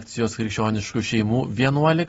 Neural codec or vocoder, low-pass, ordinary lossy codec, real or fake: none; 19.8 kHz; AAC, 24 kbps; real